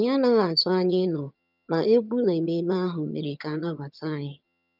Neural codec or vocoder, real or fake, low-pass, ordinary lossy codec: vocoder, 22.05 kHz, 80 mel bands, HiFi-GAN; fake; 5.4 kHz; none